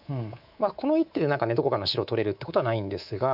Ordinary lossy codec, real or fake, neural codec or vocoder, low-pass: none; fake; codec, 24 kHz, 3.1 kbps, DualCodec; 5.4 kHz